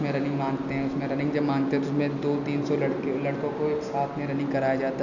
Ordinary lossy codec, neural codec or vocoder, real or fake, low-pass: none; none; real; 7.2 kHz